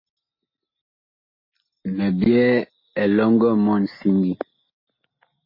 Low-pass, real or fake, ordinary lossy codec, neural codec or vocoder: 5.4 kHz; real; MP3, 24 kbps; none